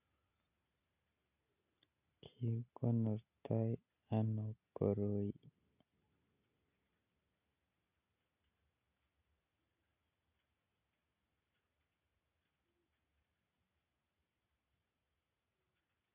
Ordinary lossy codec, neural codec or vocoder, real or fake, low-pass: none; none; real; 3.6 kHz